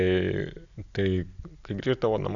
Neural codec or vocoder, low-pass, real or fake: none; 7.2 kHz; real